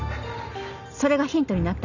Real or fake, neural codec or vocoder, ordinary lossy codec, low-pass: real; none; none; 7.2 kHz